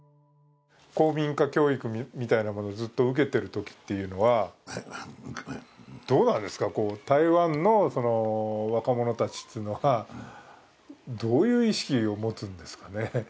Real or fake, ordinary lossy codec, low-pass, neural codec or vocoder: real; none; none; none